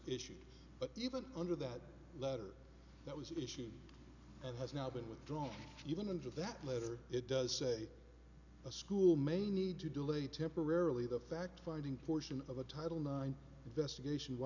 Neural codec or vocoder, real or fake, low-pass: none; real; 7.2 kHz